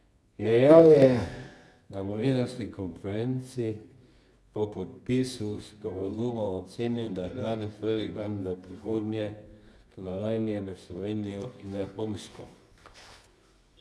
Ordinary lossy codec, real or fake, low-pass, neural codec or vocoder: none; fake; none; codec, 24 kHz, 0.9 kbps, WavTokenizer, medium music audio release